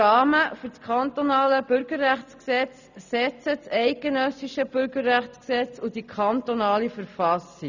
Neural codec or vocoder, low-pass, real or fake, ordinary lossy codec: none; 7.2 kHz; real; none